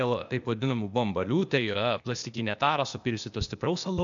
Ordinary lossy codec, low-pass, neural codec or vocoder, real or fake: MP3, 96 kbps; 7.2 kHz; codec, 16 kHz, 0.8 kbps, ZipCodec; fake